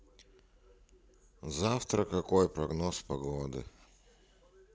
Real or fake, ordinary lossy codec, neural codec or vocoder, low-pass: real; none; none; none